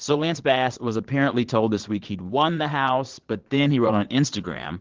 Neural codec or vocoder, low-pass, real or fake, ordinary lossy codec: vocoder, 22.05 kHz, 80 mel bands, Vocos; 7.2 kHz; fake; Opus, 16 kbps